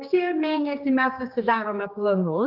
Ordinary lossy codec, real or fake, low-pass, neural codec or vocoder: Opus, 32 kbps; fake; 5.4 kHz; codec, 16 kHz, 2 kbps, X-Codec, HuBERT features, trained on general audio